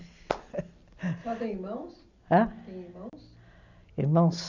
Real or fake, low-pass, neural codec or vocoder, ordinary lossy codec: real; 7.2 kHz; none; none